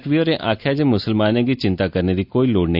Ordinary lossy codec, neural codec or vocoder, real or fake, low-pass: none; none; real; 5.4 kHz